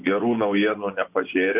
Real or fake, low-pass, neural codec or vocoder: fake; 3.6 kHz; vocoder, 24 kHz, 100 mel bands, Vocos